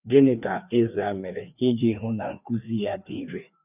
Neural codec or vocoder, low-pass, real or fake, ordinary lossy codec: codec, 16 kHz, 2 kbps, FreqCodec, larger model; 3.6 kHz; fake; none